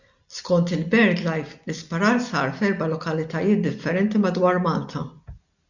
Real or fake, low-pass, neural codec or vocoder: real; 7.2 kHz; none